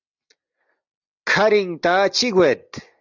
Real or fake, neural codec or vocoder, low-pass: real; none; 7.2 kHz